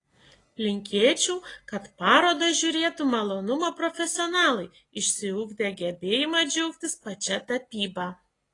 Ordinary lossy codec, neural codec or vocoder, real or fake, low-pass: AAC, 32 kbps; none; real; 10.8 kHz